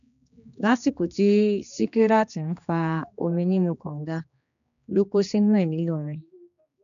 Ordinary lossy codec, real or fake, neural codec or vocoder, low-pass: none; fake; codec, 16 kHz, 2 kbps, X-Codec, HuBERT features, trained on general audio; 7.2 kHz